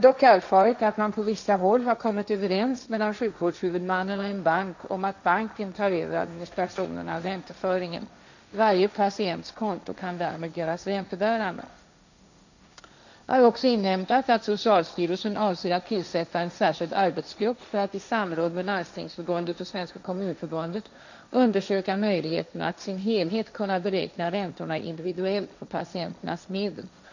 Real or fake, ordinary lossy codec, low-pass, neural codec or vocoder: fake; none; 7.2 kHz; codec, 16 kHz, 1.1 kbps, Voila-Tokenizer